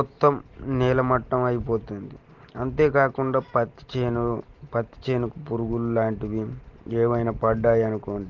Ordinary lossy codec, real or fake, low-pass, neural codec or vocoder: Opus, 16 kbps; real; 7.2 kHz; none